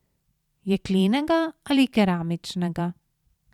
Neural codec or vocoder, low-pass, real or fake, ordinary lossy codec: vocoder, 44.1 kHz, 128 mel bands every 512 samples, BigVGAN v2; 19.8 kHz; fake; none